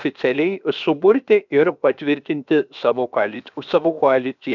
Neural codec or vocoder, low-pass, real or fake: codec, 16 kHz, 0.7 kbps, FocalCodec; 7.2 kHz; fake